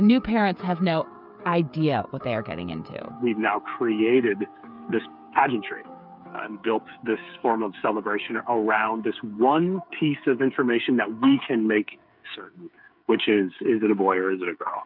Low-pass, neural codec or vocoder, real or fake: 5.4 kHz; codec, 16 kHz, 8 kbps, FreqCodec, smaller model; fake